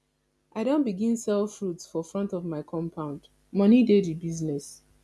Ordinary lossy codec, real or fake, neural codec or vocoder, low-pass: none; real; none; none